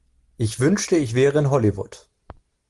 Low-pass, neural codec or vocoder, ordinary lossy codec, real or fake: 10.8 kHz; none; Opus, 24 kbps; real